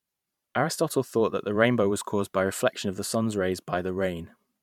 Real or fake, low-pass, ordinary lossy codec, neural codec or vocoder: fake; 19.8 kHz; MP3, 96 kbps; vocoder, 44.1 kHz, 128 mel bands every 512 samples, BigVGAN v2